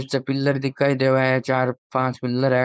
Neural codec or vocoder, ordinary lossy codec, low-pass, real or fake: codec, 16 kHz, 4.8 kbps, FACodec; none; none; fake